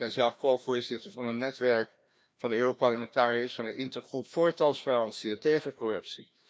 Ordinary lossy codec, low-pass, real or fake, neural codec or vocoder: none; none; fake; codec, 16 kHz, 1 kbps, FreqCodec, larger model